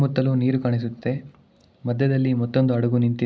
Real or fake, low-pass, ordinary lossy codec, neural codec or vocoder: real; none; none; none